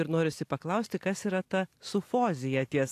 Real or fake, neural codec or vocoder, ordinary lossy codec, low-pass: real; none; AAC, 64 kbps; 14.4 kHz